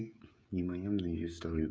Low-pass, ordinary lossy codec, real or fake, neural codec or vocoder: none; none; fake; codec, 16 kHz, 16 kbps, FreqCodec, smaller model